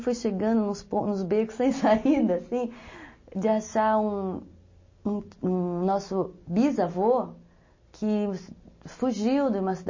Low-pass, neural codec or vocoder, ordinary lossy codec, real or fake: 7.2 kHz; none; MP3, 32 kbps; real